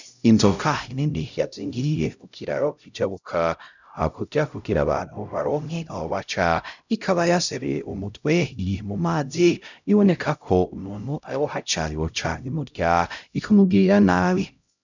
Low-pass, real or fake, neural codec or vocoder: 7.2 kHz; fake; codec, 16 kHz, 0.5 kbps, X-Codec, HuBERT features, trained on LibriSpeech